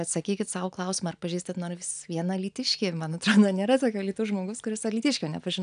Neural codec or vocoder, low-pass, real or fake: none; 9.9 kHz; real